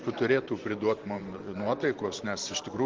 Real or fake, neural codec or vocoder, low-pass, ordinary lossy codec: fake; vocoder, 44.1 kHz, 128 mel bands every 512 samples, BigVGAN v2; 7.2 kHz; Opus, 16 kbps